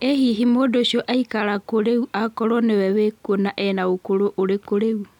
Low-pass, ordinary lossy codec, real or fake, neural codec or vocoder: 19.8 kHz; none; real; none